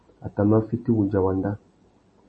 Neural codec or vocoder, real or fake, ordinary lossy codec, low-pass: none; real; MP3, 32 kbps; 10.8 kHz